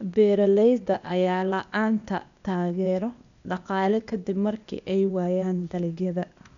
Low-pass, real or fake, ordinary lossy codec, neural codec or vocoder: 7.2 kHz; fake; none; codec, 16 kHz, 0.8 kbps, ZipCodec